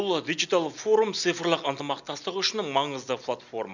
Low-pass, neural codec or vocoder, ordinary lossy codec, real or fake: 7.2 kHz; none; none; real